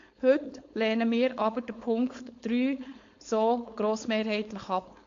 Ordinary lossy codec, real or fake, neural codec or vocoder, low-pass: AAC, 48 kbps; fake; codec, 16 kHz, 4.8 kbps, FACodec; 7.2 kHz